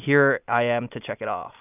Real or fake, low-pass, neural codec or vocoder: real; 3.6 kHz; none